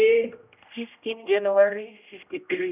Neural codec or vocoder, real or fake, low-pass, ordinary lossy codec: codec, 16 kHz, 0.5 kbps, X-Codec, HuBERT features, trained on general audio; fake; 3.6 kHz; none